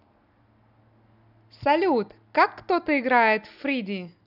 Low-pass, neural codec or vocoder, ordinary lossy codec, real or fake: 5.4 kHz; none; none; real